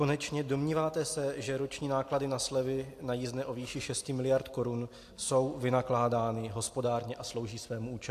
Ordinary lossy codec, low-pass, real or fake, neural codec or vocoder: AAC, 64 kbps; 14.4 kHz; real; none